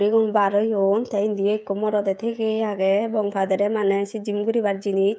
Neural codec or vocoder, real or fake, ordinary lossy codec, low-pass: codec, 16 kHz, 16 kbps, FreqCodec, smaller model; fake; none; none